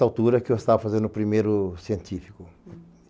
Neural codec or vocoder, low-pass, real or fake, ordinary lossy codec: none; none; real; none